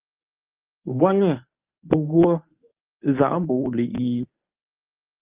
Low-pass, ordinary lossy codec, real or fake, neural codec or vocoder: 3.6 kHz; Opus, 24 kbps; fake; codec, 24 kHz, 0.9 kbps, WavTokenizer, medium speech release version 1